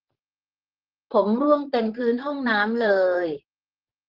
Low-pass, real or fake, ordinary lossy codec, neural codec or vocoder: 5.4 kHz; fake; Opus, 16 kbps; vocoder, 44.1 kHz, 128 mel bands, Pupu-Vocoder